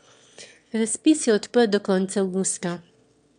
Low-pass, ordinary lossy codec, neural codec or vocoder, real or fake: 9.9 kHz; none; autoencoder, 22.05 kHz, a latent of 192 numbers a frame, VITS, trained on one speaker; fake